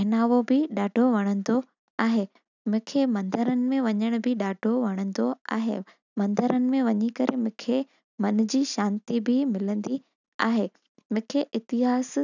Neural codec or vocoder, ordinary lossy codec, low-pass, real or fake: none; none; 7.2 kHz; real